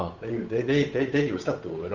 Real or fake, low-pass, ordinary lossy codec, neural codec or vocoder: fake; 7.2 kHz; none; codec, 16 kHz, 8 kbps, FunCodec, trained on Chinese and English, 25 frames a second